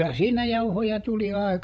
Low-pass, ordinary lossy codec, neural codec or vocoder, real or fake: none; none; codec, 16 kHz, 16 kbps, FreqCodec, larger model; fake